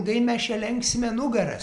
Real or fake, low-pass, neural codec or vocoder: real; 10.8 kHz; none